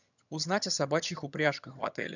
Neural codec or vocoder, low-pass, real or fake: vocoder, 22.05 kHz, 80 mel bands, HiFi-GAN; 7.2 kHz; fake